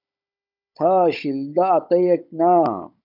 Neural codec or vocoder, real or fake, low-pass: codec, 16 kHz, 16 kbps, FunCodec, trained on Chinese and English, 50 frames a second; fake; 5.4 kHz